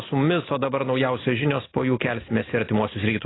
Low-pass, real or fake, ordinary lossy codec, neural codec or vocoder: 7.2 kHz; real; AAC, 16 kbps; none